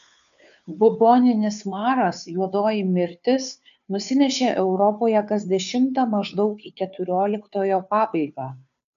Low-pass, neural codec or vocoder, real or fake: 7.2 kHz; codec, 16 kHz, 2 kbps, FunCodec, trained on Chinese and English, 25 frames a second; fake